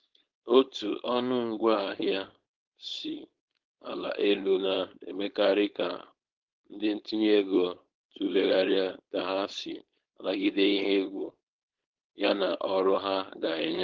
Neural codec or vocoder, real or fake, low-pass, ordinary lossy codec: codec, 16 kHz, 4.8 kbps, FACodec; fake; 7.2 kHz; Opus, 16 kbps